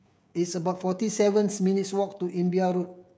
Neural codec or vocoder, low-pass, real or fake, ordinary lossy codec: codec, 16 kHz, 16 kbps, FreqCodec, smaller model; none; fake; none